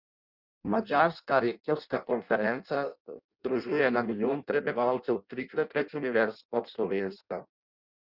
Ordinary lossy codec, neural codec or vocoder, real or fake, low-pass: Opus, 64 kbps; codec, 16 kHz in and 24 kHz out, 0.6 kbps, FireRedTTS-2 codec; fake; 5.4 kHz